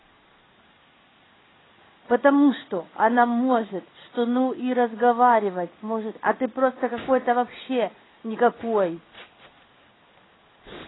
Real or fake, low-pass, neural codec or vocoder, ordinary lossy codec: fake; 7.2 kHz; codec, 16 kHz in and 24 kHz out, 1 kbps, XY-Tokenizer; AAC, 16 kbps